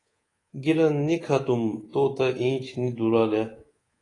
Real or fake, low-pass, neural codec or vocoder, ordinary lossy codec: fake; 10.8 kHz; codec, 24 kHz, 3.1 kbps, DualCodec; AAC, 32 kbps